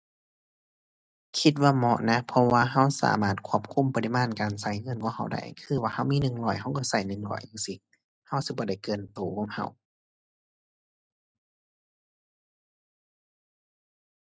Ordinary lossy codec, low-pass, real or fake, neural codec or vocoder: none; none; real; none